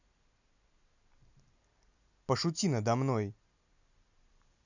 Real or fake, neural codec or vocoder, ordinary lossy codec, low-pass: real; none; none; 7.2 kHz